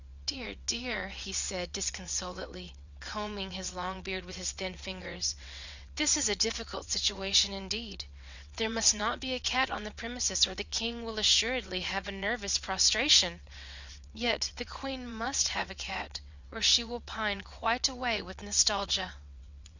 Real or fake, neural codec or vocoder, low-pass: fake; vocoder, 22.05 kHz, 80 mel bands, WaveNeXt; 7.2 kHz